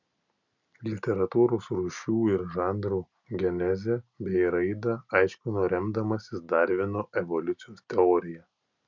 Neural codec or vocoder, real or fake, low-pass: none; real; 7.2 kHz